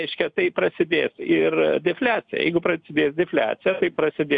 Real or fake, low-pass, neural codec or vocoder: real; 9.9 kHz; none